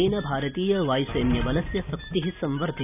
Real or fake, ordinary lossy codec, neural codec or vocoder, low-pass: real; none; none; 3.6 kHz